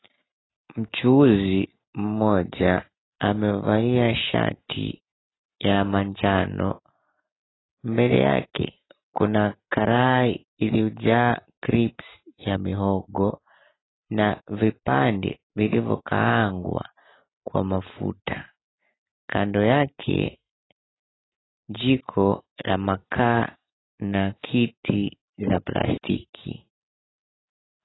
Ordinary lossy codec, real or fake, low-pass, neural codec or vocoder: AAC, 16 kbps; real; 7.2 kHz; none